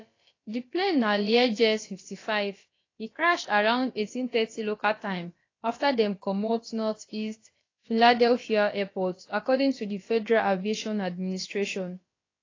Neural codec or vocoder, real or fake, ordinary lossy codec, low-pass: codec, 16 kHz, about 1 kbps, DyCAST, with the encoder's durations; fake; AAC, 32 kbps; 7.2 kHz